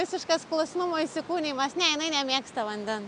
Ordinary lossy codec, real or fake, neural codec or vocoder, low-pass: MP3, 96 kbps; real; none; 9.9 kHz